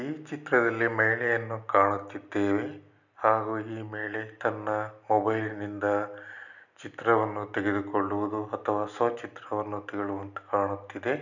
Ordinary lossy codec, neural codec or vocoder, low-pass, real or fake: none; none; 7.2 kHz; real